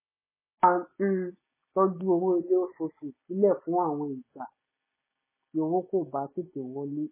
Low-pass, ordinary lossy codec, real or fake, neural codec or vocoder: 3.6 kHz; MP3, 16 kbps; real; none